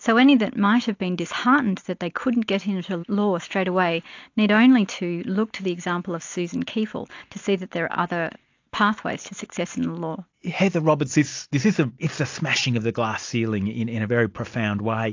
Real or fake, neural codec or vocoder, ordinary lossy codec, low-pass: real; none; AAC, 48 kbps; 7.2 kHz